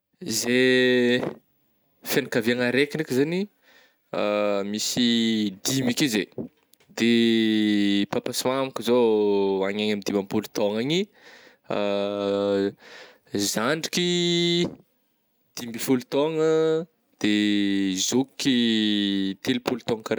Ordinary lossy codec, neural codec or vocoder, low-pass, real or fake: none; none; none; real